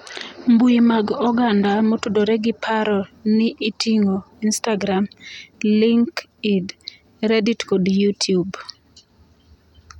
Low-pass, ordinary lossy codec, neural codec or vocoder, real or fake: 19.8 kHz; none; none; real